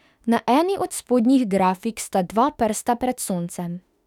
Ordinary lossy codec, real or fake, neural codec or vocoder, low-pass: none; fake; autoencoder, 48 kHz, 32 numbers a frame, DAC-VAE, trained on Japanese speech; 19.8 kHz